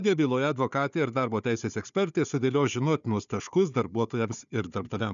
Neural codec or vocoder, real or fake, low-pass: codec, 16 kHz, 4 kbps, FunCodec, trained on Chinese and English, 50 frames a second; fake; 7.2 kHz